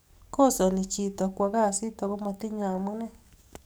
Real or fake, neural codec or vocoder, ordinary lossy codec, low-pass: fake; codec, 44.1 kHz, 7.8 kbps, DAC; none; none